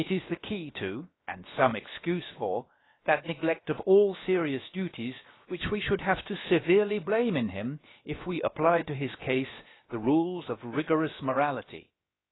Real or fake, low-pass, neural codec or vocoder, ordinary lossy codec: fake; 7.2 kHz; codec, 16 kHz, about 1 kbps, DyCAST, with the encoder's durations; AAC, 16 kbps